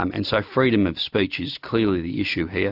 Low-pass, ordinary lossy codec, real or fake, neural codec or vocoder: 5.4 kHz; AAC, 48 kbps; real; none